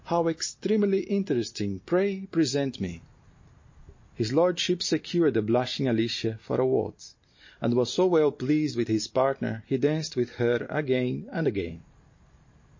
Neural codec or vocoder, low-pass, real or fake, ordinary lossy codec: none; 7.2 kHz; real; MP3, 32 kbps